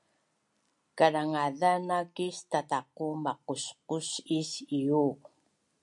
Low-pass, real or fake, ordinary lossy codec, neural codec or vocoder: 10.8 kHz; real; MP3, 96 kbps; none